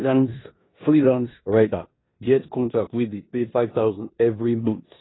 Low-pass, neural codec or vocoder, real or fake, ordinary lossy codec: 7.2 kHz; codec, 16 kHz in and 24 kHz out, 0.9 kbps, LongCat-Audio-Codec, four codebook decoder; fake; AAC, 16 kbps